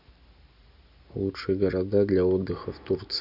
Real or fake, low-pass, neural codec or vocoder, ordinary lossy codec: real; 5.4 kHz; none; none